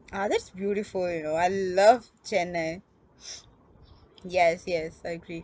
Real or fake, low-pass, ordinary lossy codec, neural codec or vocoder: real; none; none; none